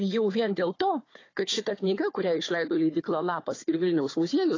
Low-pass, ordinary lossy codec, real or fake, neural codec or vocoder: 7.2 kHz; AAC, 32 kbps; fake; codec, 16 kHz, 4 kbps, FunCodec, trained on Chinese and English, 50 frames a second